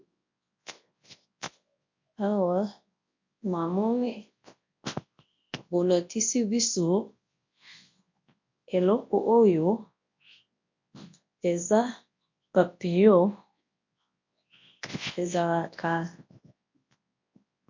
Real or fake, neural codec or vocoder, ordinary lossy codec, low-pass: fake; codec, 24 kHz, 0.9 kbps, WavTokenizer, large speech release; MP3, 48 kbps; 7.2 kHz